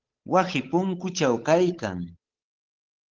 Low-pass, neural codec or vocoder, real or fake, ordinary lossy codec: 7.2 kHz; codec, 16 kHz, 8 kbps, FunCodec, trained on Chinese and English, 25 frames a second; fake; Opus, 16 kbps